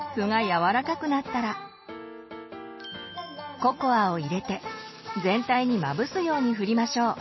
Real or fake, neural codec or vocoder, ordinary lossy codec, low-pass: real; none; MP3, 24 kbps; 7.2 kHz